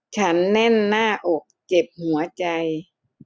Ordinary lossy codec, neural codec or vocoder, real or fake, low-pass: none; none; real; none